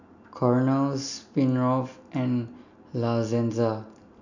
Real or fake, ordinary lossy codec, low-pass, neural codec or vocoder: real; none; 7.2 kHz; none